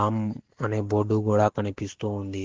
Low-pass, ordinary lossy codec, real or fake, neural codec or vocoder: 7.2 kHz; Opus, 16 kbps; real; none